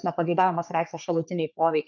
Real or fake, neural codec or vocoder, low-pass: fake; codec, 44.1 kHz, 3.4 kbps, Pupu-Codec; 7.2 kHz